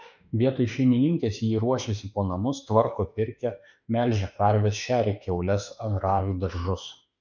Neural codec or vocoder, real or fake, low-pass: autoencoder, 48 kHz, 32 numbers a frame, DAC-VAE, trained on Japanese speech; fake; 7.2 kHz